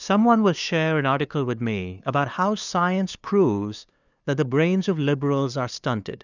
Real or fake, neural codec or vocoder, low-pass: fake; codec, 16 kHz, 2 kbps, FunCodec, trained on LibriTTS, 25 frames a second; 7.2 kHz